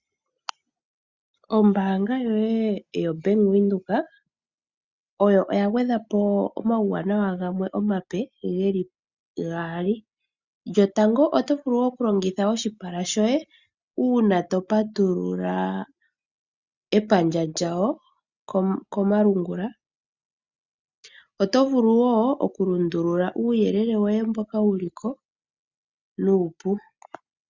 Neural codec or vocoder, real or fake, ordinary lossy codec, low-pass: none; real; Opus, 64 kbps; 7.2 kHz